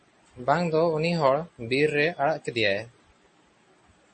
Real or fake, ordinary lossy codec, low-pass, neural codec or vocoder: real; MP3, 32 kbps; 9.9 kHz; none